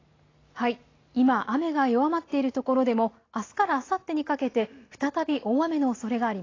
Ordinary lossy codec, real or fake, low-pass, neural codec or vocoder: AAC, 32 kbps; real; 7.2 kHz; none